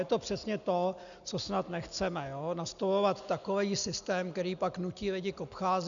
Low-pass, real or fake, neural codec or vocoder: 7.2 kHz; real; none